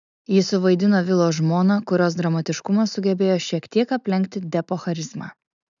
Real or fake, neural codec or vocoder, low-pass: real; none; 7.2 kHz